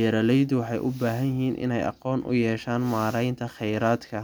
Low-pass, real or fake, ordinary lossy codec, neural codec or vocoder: none; real; none; none